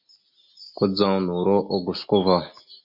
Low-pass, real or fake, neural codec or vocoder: 5.4 kHz; real; none